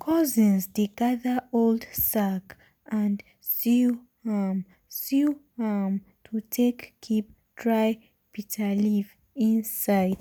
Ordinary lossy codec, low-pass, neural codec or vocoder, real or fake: none; none; none; real